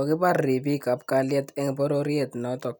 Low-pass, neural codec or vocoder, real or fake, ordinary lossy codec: 19.8 kHz; none; real; none